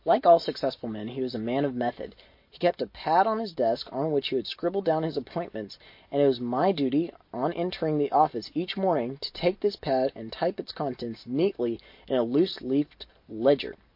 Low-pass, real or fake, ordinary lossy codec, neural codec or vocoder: 5.4 kHz; real; MP3, 32 kbps; none